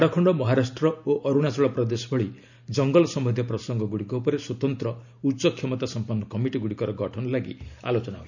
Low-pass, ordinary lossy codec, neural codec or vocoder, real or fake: 7.2 kHz; none; none; real